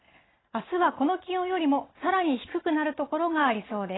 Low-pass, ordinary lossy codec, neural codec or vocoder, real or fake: 7.2 kHz; AAC, 16 kbps; none; real